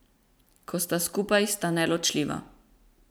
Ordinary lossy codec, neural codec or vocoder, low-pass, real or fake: none; none; none; real